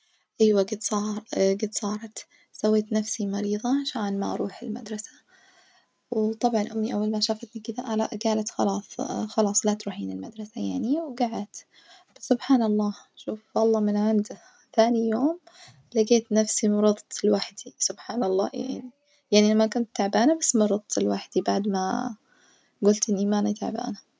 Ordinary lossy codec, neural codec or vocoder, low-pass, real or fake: none; none; none; real